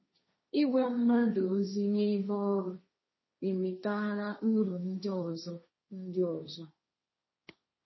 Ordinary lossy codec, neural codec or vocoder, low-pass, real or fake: MP3, 24 kbps; codec, 16 kHz, 1.1 kbps, Voila-Tokenizer; 7.2 kHz; fake